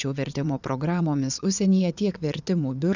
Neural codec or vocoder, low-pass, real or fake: vocoder, 22.05 kHz, 80 mel bands, WaveNeXt; 7.2 kHz; fake